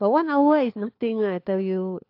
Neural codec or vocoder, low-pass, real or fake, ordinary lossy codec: codec, 16 kHz, 4 kbps, FunCodec, trained on LibriTTS, 50 frames a second; 5.4 kHz; fake; AAC, 32 kbps